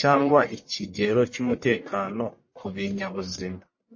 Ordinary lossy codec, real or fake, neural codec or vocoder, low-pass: MP3, 32 kbps; fake; codec, 44.1 kHz, 1.7 kbps, Pupu-Codec; 7.2 kHz